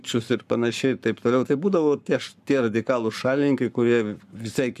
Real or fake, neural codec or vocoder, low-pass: fake; codec, 44.1 kHz, 7.8 kbps, Pupu-Codec; 14.4 kHz